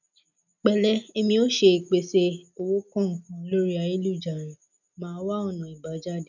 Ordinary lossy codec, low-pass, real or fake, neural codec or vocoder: none; 7.2 kHz; real; none